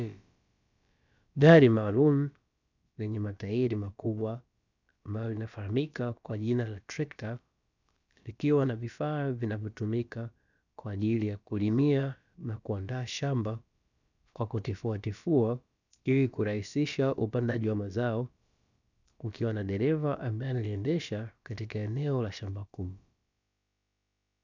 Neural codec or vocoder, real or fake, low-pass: codec, 16 kHz, about 1 kbps, DyCAST, with the encoder's durations; fake; 7.2 kHz